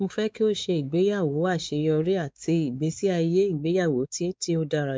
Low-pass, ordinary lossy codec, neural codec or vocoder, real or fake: none; none; codec, 16 kHz, 4 kbps, X-Codec, WavLM features, trained on Multilingual LibriSpeech; fake